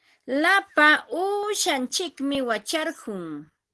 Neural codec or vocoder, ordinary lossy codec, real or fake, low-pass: none; Opus, 16 kbps; real; 10.8 kHz